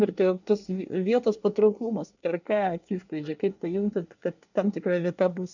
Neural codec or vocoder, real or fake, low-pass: codec, 24 kHz, 1 kbps, SNAC; fake; 7.2 kHz